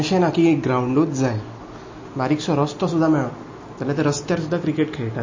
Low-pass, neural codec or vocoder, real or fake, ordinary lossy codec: 7.2 kHz; none; real; MP3, 32 kbps